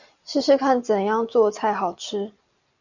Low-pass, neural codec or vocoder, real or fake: 7.2 kHz; none; real